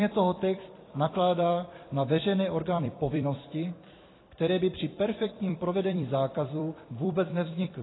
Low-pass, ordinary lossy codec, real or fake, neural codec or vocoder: 7.2 kHz; AAC, 16 kbps; fake; vocoder, 44.1 kHz, 128 mel bands every 256 samples, BigVGAN v2